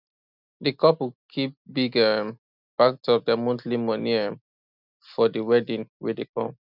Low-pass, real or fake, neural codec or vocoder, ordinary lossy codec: 5.4 kHz; real; none; none